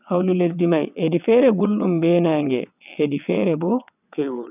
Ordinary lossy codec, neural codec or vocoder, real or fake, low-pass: none; vocoder, 22.05 kHz, 80 mel bands, WaveNeXt; fake; 3.6 kHz